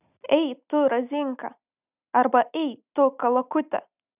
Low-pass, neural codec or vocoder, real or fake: 3.6 kHz; none; real